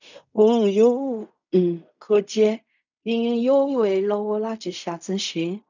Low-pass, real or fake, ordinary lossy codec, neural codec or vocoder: 7.2 kHz; fake; none; codec, 16 kHz in and 24 kHz out, 0.4 kbps, LongCat-Audio-Codec, fine tuned four codebook decoder